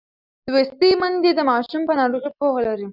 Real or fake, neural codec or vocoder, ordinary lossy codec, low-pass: real; none; Opus, 64 kbps; 5.4 kHz